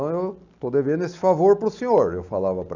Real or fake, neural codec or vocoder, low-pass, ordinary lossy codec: real; none; 7.2 kHz; none